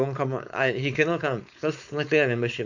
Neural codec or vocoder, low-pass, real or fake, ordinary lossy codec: codec, 16 kHz, 4.8 kbps, FACodec; 7.2 kHz; fake; none